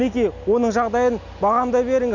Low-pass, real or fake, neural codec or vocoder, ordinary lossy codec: 7.2 kHz; real; none; none